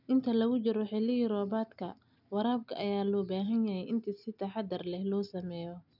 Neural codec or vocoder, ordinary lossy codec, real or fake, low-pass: none; none; real; 5.4 kHz